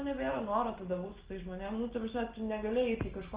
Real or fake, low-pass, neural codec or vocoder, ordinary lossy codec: real; 3.6 kHz; none; Opus, 16 kbps